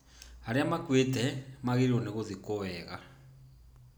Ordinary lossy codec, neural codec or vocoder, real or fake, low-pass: none; none; real; none